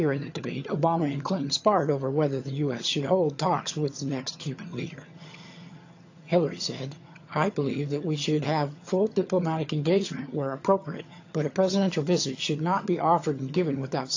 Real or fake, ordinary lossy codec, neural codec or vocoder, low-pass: fake; AAC, 48 kbps; vocoder, 22.05 kHz, 80 mel bands, HiFi-GAN; 7.2 kHz